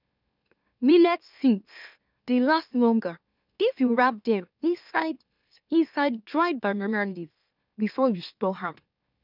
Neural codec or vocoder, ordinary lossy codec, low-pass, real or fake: autoencoder, 44.1 kHz, a latent of 192 numbers a frame, MeloTTS; none; 5.4 kHz; fake